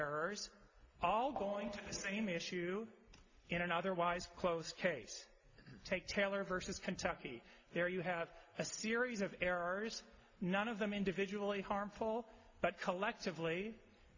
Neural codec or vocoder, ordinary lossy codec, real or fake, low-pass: none; AAC, 48 kbps; real; 7.2 kHz